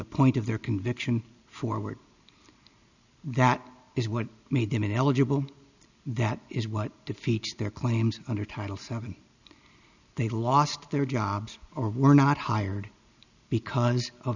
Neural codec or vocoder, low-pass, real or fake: none; 7.2 kHz; real